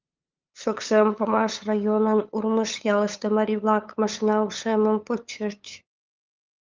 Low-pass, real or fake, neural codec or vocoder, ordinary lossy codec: 7.2 kHz; fake; codec, 16 kHz, 8 kbps, FunCodec, trained on LibriTTS, 25 frames a second; Opus, 24 kbps